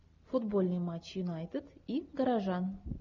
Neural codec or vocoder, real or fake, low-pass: none; real; 7.2 kHz